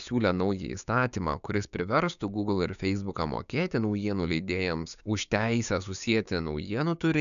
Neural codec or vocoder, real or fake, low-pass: codec, 16 kHz, 6 kbps, DAC; fake; 7.2 kHz